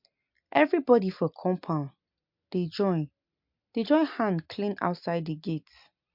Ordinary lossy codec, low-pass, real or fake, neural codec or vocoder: MP3, 48 kbps; 5.4 kHz; real; none